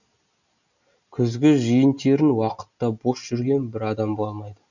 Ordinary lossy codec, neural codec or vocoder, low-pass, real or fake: none; none; 7.2 kHz; real